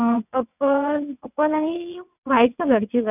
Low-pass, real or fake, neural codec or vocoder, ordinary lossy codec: 3.6 kHz; fake; vocoder, 22.05 kHz, 80 mel bands, WaveNeXt; none